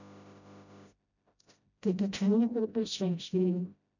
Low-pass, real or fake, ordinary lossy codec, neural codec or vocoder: 7.2 kHz; fake; none; codec, 16 kHz, 0.5 kbps, FreqCodec, smaller model